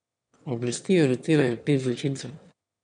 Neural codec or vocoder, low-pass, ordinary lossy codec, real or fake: autoencoder, 22.05 kHz, a latent of 192 numbers a frame, VITS, trained on one speaker; 9.9 kHz; none; fake